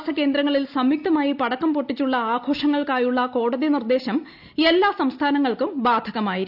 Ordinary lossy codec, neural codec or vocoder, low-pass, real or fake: none; none; 5.4 kHz; real